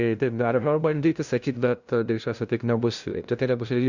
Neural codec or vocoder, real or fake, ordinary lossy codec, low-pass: codec, 16 kHz, 0.5 kbps, FunCodec, trained on LibriTTS, 25 frames a second; fake; Opus, 64 kbps; 7.2 kHz